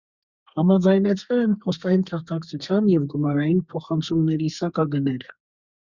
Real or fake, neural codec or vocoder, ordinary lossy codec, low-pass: fake; codec, 32 kHz, 1.9 kbps, SNAC; Opus, 64 kbps; 7.2 kHz